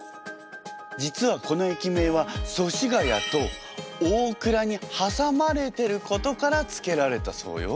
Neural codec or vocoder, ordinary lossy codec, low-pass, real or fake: none; none; none; real